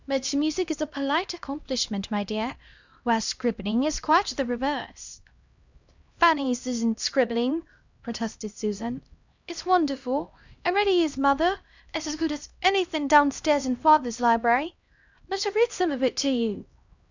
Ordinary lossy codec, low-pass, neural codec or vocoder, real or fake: Opus, 64 kbps; 7.2 kHz; codec, 16 kHz, 1 kbps, X-Codec, HuBERT features, trained on LibriSpeech; fake